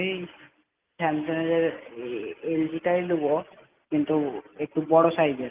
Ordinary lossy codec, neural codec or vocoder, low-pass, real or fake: Opus, 32 kbps; none; 3.6 kHz; real